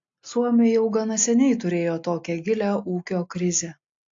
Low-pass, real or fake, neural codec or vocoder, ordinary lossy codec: 7.2 kHz; real; none; AAC, 48 kbps